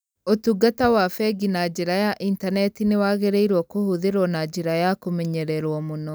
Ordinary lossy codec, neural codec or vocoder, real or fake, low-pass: none; none; real; none